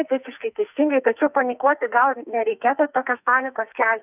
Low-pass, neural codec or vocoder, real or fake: 3.6 kHz; codec, 44.1 kHz, 2.6 kbps, SNAC; fake